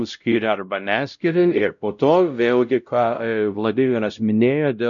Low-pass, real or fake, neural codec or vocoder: 7.2 kHz; fake; codec, 16 kHz, 0.5 kbps, X-Codec, WavLM features, trained on Multilingual LibriSpeech